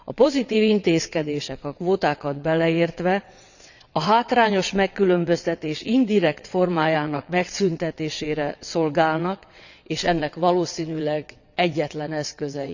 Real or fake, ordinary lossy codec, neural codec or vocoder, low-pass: fake; none; vocoder, 22.05 kHz, 80 mel bands, WaveNeXt; 7.2 kHz